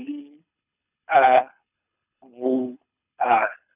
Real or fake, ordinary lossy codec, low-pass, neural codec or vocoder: fake; none; 3.6 kHz; codec, 24 kHz, 6 kbps, HILCodec